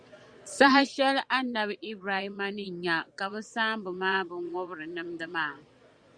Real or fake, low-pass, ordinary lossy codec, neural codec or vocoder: fake; 9.9 kHz; Opus, 64 kbps; vocoder, 22.05 kHz, 80 mel bands, Vocos